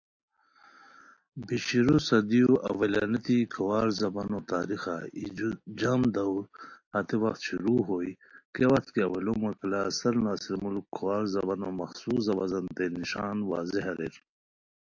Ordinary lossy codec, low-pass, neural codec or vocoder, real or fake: AAC, 48 kbps; 7.2 kHz; none; real